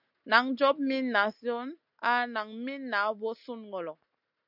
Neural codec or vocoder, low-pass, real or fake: none; 5.4 kHz; real